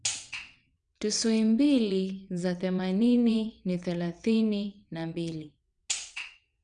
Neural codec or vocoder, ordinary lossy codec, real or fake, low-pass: vocoder, 22.05 kHz, 80 mel bands, WaveNeXt; none; fake; 9.9 kHz